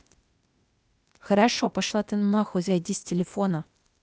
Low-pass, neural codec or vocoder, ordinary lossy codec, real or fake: none; codec, 16 kHz, 0.8 kbps, ZipCodec; none; fake